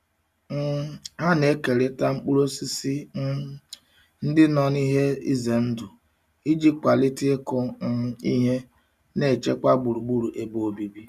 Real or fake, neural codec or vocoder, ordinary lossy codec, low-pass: real; none; none; 14.4 kHz